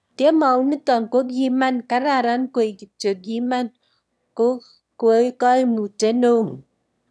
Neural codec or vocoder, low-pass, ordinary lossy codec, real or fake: autoencoder, 22.05 kHz, a latent of 192 numbers a frame, VITS, trained on one speaker; none; none; fake